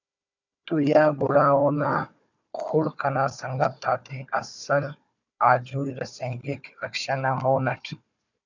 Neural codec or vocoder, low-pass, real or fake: codec, 16 kHz, 4 kbps, FunCodec, trained on Chinese and English, 50 frames a second; 7.2 kHz; fake